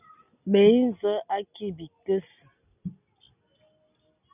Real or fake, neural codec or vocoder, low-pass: real; none; 3.6 kHz